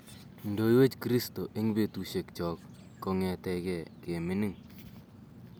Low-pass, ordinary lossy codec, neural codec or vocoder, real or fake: none; none; none; real